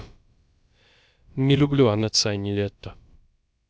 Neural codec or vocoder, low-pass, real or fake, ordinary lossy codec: codec, 16 kHz, about 1 kbps, DyCAST, with the encoder's durations; none; fake; none